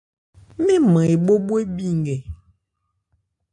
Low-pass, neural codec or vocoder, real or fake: 10.8 kHz; none; real